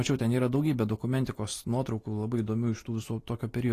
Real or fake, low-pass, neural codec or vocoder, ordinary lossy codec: real; 14.4 kHz; none; AAC, 48 kbps